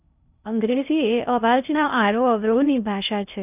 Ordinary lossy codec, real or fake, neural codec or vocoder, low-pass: none; fake; codec, 16 kHz in and 24 kHz out, 0.6 kbps, FocalCodec, streaming, 2048 codes; 3.6 kHz